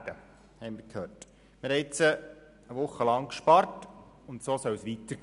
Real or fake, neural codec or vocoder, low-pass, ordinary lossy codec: real; none; 10.8 kHz; none